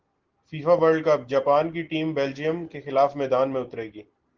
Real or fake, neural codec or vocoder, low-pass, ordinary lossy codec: real; none; 7.2 kHz; Opus, 16 kbps